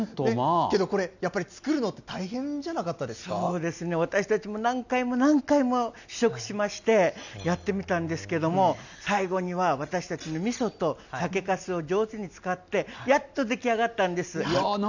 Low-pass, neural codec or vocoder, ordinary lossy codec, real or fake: 7.2 kHz; none; none; real